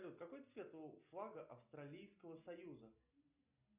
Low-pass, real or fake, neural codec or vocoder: 3.6 kHz; real; none